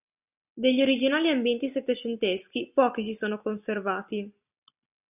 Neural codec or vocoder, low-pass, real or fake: none; 3.6 kHz; real